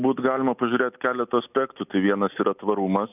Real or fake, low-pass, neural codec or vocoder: real; 3.6 kHz; none